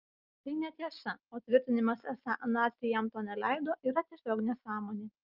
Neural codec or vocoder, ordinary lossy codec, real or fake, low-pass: none; Opus, 24 kbps; real; 5.4 kHz